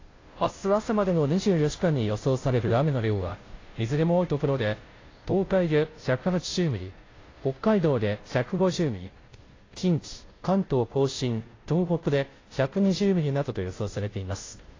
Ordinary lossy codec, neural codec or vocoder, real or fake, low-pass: AAC, 32 kbps; codec, 16 kHz, 0.5 kbps, FunCodec, trained on Chinese and English, 25 frames a second; fake; 7.2 kHz